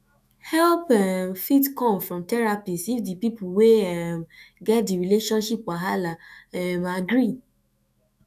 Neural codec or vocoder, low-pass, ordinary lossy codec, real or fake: autoencoder, 48 kHz, 128 numbers a frame, DAC-VAE, trained on Japanese speech; 14.4 kHz; none; fake